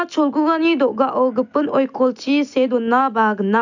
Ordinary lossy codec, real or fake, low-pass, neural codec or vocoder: none; real; 7.2 kHz; none